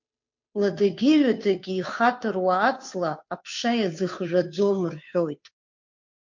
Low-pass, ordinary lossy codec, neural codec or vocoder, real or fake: 7.2 kHz; MP3, 48 kbps; codec, 16 kHz, 2 kbps, FunCodec, trained on Chinese and English, 25 frames a second; fake